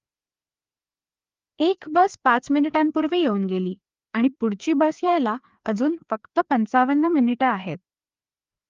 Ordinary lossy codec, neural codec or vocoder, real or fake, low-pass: Opus, 32 kbps; codec, 16 kHz, 2 kbps, FreqCodec, larger model; fake; 7.2 kHz